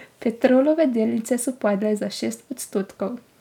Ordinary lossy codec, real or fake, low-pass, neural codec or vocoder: none; real; 19.8 kHz; none